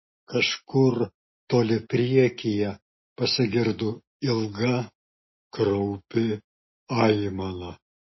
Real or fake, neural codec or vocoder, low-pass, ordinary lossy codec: real; none; 7.2 kHz; MP3, 24 kbps